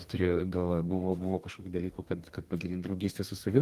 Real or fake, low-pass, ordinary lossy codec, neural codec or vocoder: fake; 14.4 kHz; Opus, 24 kbps; codec, 32 kHz, 1.9 kbps, SNAC